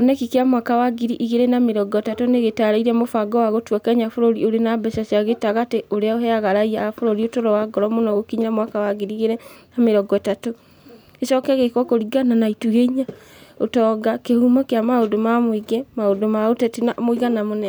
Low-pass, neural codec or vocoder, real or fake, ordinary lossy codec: none; none; real; none